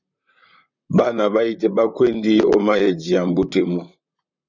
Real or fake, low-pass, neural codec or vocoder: fake; 7.2 kHz; vocoder, 44.1 kHz, 128 mel bands, Pupu-Vocoder